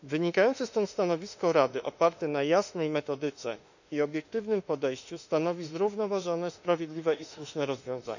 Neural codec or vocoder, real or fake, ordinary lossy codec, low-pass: autoencoder, 48 kHz, 32 numbers a frame, DAC-VAE, trained on Japanese speech; fake; none; 7.2 kHz